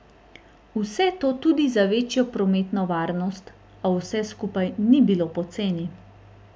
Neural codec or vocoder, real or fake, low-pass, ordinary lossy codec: none; real; none; none